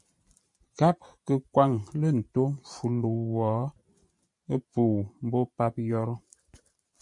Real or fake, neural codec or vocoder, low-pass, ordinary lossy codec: fake; vocoder, 44.1 kHz, 128 mel bands every 512 samples, BigVGAN v2; 10.8 kHz; MP3, 64 kbps